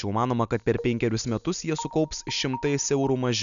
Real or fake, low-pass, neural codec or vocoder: real; 7.2 kHz; none